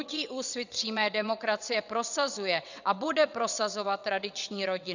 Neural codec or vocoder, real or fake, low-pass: vocoder, 22.05 kHz, 80 mel bands, WaveNeXt; fake; 7.2 kHz